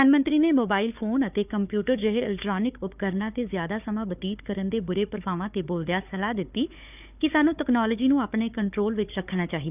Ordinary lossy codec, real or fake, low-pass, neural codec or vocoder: none; fake; 3.6 kHz; codec, 16 kHz, 4 kbps, FunCodec, trained on Chinese and English, 50 frames a second